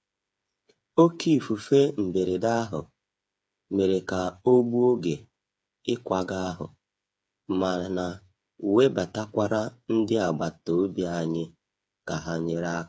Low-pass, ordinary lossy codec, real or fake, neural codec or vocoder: none; none; fake; codec, 16 kHz, 8 kbps, FreqCodec, smaller model